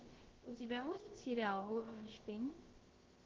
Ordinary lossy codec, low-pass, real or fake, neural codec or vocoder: Opus, 16 kbps; 7.2 kHz; fake; codec, 16 kHz, about 1 kbps, DyCAST, with the encoder's durations